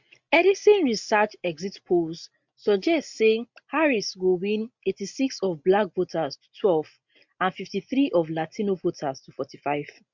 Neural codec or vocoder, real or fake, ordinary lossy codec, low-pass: none; real; MP3, 64 kbps; 7.2 kHz